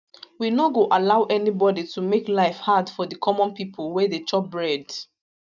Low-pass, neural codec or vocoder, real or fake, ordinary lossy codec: 7.2 kHz; none; real; none